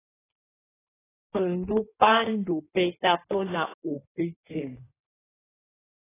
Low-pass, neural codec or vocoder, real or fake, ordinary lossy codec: 3.6 kHz; codec, 16 kHz in and 24 kHz out, 1.1 kbps, FireRedTTS-2 codec; fake; AAC, 16 kbps